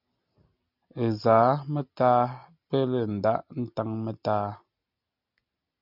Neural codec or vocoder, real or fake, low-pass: none; real; 5.4 kHz